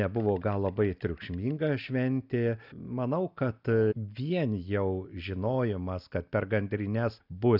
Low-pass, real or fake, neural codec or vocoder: 5.4 kHz; real; none